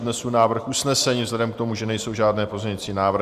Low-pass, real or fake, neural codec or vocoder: 14.4 kHz; fake; vocoder, 44.1 kHz, 128 mel bands every 512 samples, BigVGAN v2